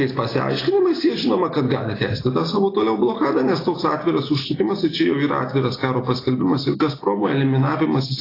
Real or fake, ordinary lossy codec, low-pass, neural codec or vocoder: real; AAC, 24 kbps; 5.4 kHz; none